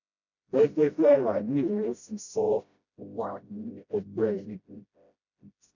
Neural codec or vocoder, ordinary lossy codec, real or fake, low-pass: codec, 16 kHz, 0.5 kbps, FreqCodec, smaller model; none; fake; 7.2 kHz